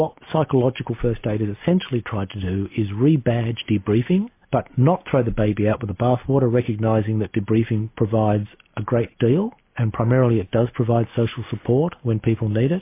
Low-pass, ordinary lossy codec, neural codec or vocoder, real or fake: 3.6 kHz; MP3, 24 kbps; none; real